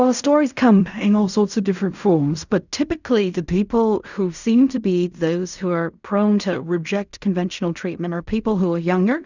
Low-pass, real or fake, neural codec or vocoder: 7.2 kHz; fake; codec, 16 kHz in and 24 kHz out, 0.4 kbps, LongCat-Audio-Codec, fine tuned four codebook decoder